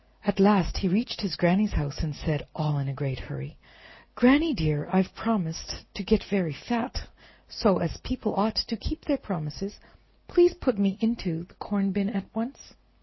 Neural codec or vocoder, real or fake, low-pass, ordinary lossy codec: none; real; 7.2 kHz; MP3, 24 kbps